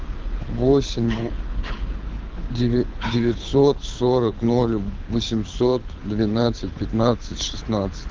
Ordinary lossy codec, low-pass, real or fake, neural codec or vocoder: Opus, 24 kbps; 7.2 kHz; fake; codec, 24 kHz, 6 kbps, HILCodec